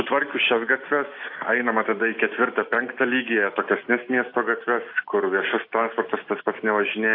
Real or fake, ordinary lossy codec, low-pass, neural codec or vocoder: real; AAC, 32 kbps; 5.4 kHz; none